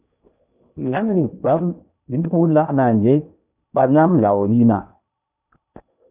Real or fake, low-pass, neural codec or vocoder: fake; 3.6 kHz; codec, 16 kHz in and 24 kHz out, 0.8 kbps, FocalCodec, streaming, 65536 codes